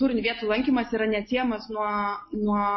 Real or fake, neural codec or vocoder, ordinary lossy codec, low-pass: real; none; MP3, 24 kbps; 7.2 kHz